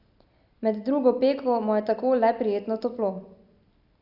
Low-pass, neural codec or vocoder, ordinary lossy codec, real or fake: 5.4 kHz; none; AAC, 48 kbps; real